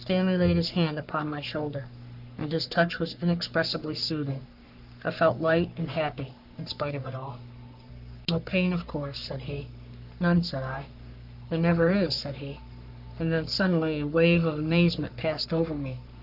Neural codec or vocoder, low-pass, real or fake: codec, 44.1 kHz, 3.4 kbps, Pupu-Codec; 5.4 kHz; fake